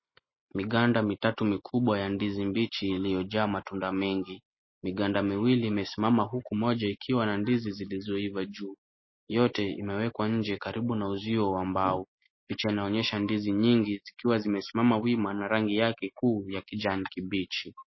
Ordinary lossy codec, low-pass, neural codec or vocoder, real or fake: MP3, 24 kbps; 7.2 kHz; none; real